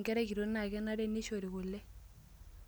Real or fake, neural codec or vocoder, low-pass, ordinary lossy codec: real; none; none; none